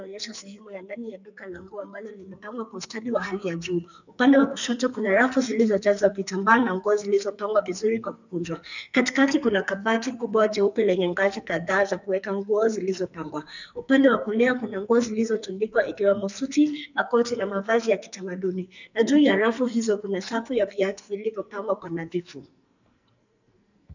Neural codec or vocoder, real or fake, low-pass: codec, 44.1 kHz, 2.6 kbps, SNAC; fake; 7.2 kHz